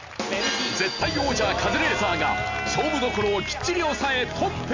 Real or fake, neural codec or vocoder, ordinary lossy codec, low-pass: real; none; AAC, 48 kbps; 7.2 kHz